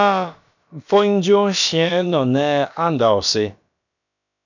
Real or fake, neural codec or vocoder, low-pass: fake; codec, 16 kHz, about 1 kbps, DyCAST, with the encoder's durations; 7.2 kHz